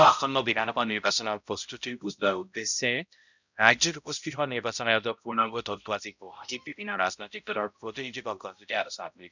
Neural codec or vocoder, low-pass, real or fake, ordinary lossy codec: codec, 16 kHz, 0.5 kbps, X-Codec, HuBERT features, trained on balanced general audio; 7.2 kHz; fake; none